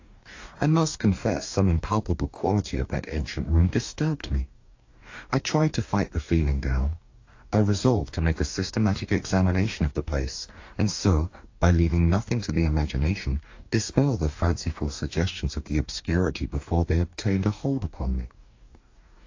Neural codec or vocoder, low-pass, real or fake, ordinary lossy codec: codec, 44.1 kHz, 2.6 kbps, DAC; 7.2 kHz; fake; AAC, 48 kbps